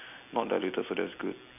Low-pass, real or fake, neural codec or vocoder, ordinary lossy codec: 3.6 kHz; real; none; none